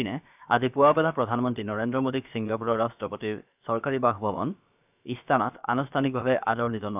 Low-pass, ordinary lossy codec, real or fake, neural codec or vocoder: 3.6 kHz; none; fake; codec, 16 kHz, about 1 kbps, DyCAST, with the encoder's durations